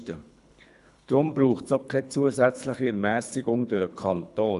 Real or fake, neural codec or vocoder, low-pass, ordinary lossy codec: fake; codec, 24 kHz, 3 kbps, HILCodec; 10.8 kHz; none